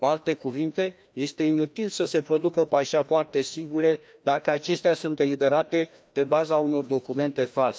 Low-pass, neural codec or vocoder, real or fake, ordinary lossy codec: none; codec, 16 kHz, 1 kbps, FreqCodec, larger model; fake; none